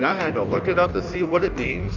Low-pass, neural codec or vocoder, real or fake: 7.2 kHz; codec, 16 kHz in and 24 kHz out, 1.1 kbps, FireRedTTS-2 codec; fake